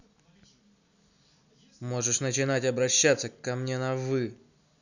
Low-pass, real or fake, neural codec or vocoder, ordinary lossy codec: 7.2 kHz; real; none; none